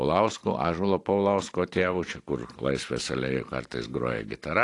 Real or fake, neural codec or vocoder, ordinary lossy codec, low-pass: fake; vocoder, 44.1 kHz, 128 mel bands every 512 samples, BigVGAN v2; AAC, 48 kbps; 10.8 kHz